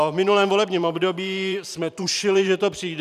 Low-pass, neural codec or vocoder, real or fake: 14.4 kHz; vocoder, 44.1 kHz, 128 mel bands every 512 samples, BigVGAN v2; fake